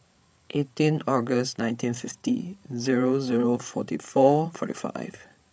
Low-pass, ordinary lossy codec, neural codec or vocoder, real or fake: none; none; codec, 16 kHz, 8 kbps, FreqCodec, larger model; fake